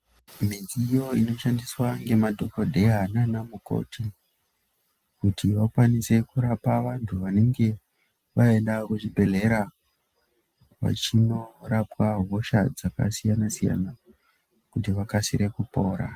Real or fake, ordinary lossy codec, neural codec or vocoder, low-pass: real; Opus, 32 kbps; none; 14.4 kHz